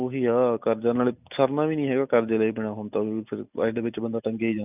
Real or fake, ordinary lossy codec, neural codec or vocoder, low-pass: real; none; none; 3.6 kHz